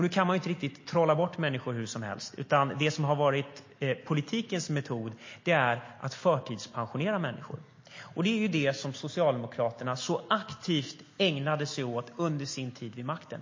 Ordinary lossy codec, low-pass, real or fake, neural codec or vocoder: MP3, 32 kbps; 7.2 kHz; real; none